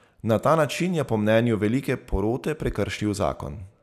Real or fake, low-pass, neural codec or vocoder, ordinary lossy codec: real; 14.4 kHz; none; none